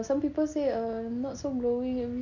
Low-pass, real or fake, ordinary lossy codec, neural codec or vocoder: 7.2 kHz; real; none; none